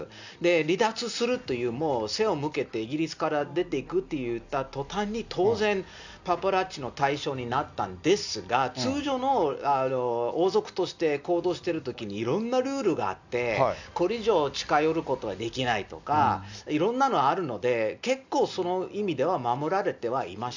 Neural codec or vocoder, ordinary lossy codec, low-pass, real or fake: none; none; 7.2 kHz; real